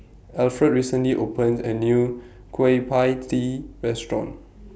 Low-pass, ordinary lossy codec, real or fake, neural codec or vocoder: none; none; real; none